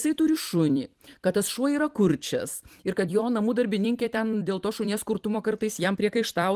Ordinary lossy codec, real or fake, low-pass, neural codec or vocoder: Opus, 24 kbps; fake; 14.4 kHz; vocoder, 44.1 kHz, 128 mel bands every 256 samples, BigVGAN v2